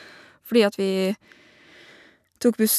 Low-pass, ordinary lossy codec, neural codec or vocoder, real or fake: 14.4 kHz; none; none; real